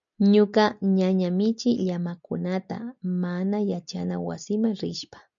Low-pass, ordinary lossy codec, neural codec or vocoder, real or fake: 7.2 kHz; MP3, 96 kbps; none; real